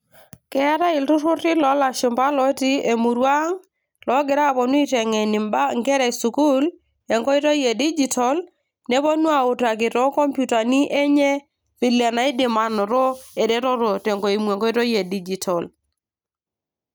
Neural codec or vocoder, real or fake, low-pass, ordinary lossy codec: none; real; none; none